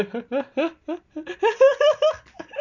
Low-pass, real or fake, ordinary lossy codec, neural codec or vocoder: 7.2 kHz; real; none; none